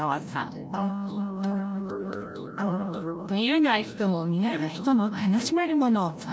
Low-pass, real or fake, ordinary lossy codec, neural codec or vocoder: none; fake; none; codec, 16 kHz, 0.5 kbps, FreqCodec, larger model